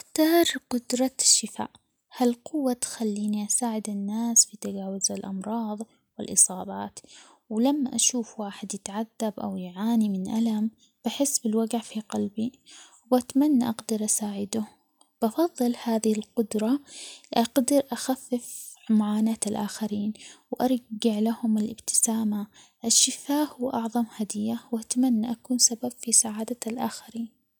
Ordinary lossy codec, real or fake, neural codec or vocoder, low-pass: none; real; none; none